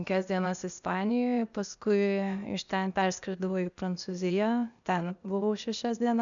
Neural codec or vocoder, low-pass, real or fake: codec, 16 kHz, 0.8 kbps, ZipCodec; 7.2 kHz; fake